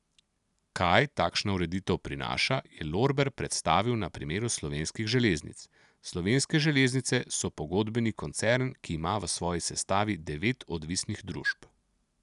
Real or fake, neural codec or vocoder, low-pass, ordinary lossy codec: real; none; 10.8 kHz; none